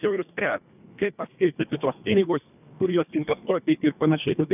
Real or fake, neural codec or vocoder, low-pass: fake; codec, 24 kHz, 1.5 kbps, HILCodec; 3.6 kHz